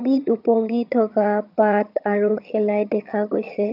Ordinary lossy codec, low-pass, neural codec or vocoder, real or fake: none; 5.4 kHz; vocoder, 22.05 kHz, 80 mel bands, HiFi-GAN; fake